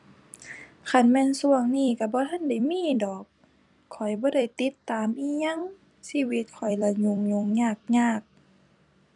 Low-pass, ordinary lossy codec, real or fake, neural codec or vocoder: 10.8 kHz; none; fake; vocoder, 44.1 kHz, 128 mel bands every 256 samples, BigVGAN v2